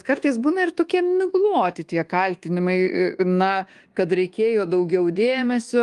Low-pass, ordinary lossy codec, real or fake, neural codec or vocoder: 10.8 kHz; Opus, 24 kbps; fake; codec, 24 kHz, 1.2 kbps, DualCodec